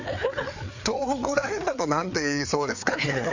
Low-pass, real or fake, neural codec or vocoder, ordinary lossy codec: 7.2 kHz; fake; codec, 16 kHz, 4 kbps, FreqCodec, larger model; none